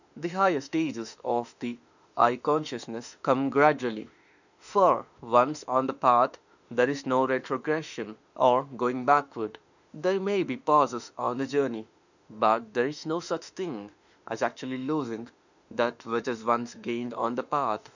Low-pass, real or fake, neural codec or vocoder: 7.2 kHz; fake; autoencoder, 48 kHz, 32 numbers a frame, DAC-VAE, trained on Japanese speech